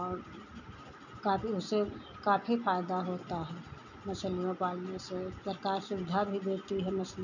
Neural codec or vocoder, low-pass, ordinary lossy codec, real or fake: none; 7.2 kHz; none; real